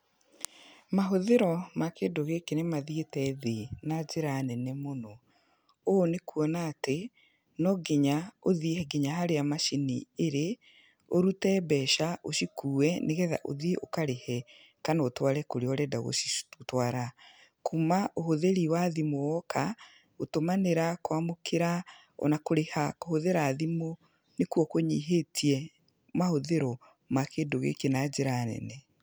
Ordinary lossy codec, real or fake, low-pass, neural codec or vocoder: none; real; none; none